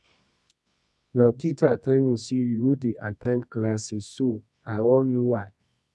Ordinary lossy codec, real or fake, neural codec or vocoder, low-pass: none; fake; codec, 24 kHz, 0.9 kbps, WavTokenizer, medium music audio release; none